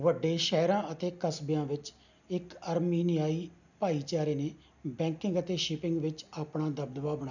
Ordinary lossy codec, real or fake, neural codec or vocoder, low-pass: none; real; none; 7.2 kHz